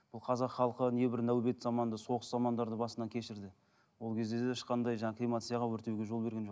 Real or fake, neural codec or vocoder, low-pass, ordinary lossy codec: real; none; none; none